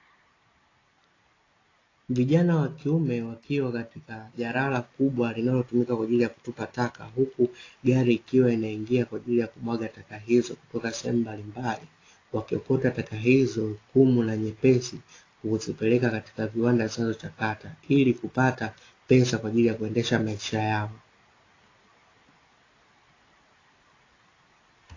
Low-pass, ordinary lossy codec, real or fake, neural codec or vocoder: 7.2 kHz; AAC, 32 kbps; real; none